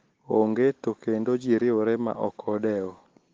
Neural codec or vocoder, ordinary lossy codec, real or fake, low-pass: none; Opus, 16 kbps; real; 7.2 kHz